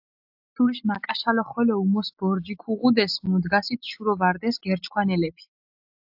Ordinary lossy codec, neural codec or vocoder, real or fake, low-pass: AAC, 48 kbps; none; real; 5.4 kHz